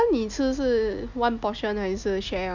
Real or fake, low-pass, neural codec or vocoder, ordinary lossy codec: real; 7.2 kHz; none; none